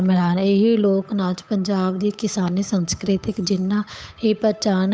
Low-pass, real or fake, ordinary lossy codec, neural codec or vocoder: none; fake; none; codec, 16 kHz, 8 kbps, FunCodec, trained on Chinese and English, 25 frames a second